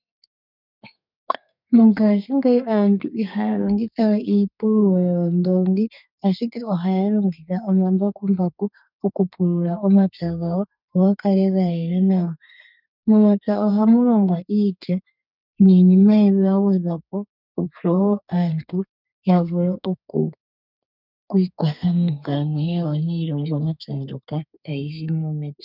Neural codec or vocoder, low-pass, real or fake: codec, 32 kHz, 1.9 kbps, SNAC; 5.4 kHz; fake